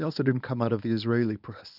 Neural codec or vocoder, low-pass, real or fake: codec, 24 kHz, 0.9 kbps, WavTokenizer, medium speech release version 1; 5.4 kHz; fake